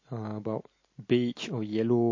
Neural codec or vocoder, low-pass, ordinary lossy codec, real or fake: none; 7.2 kHz; MP3, 32 kbps; real